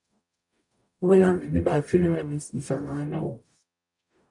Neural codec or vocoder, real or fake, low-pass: codec, 44.1 kHz, 0.9 kbps, DAC; fake; 10.8 kHz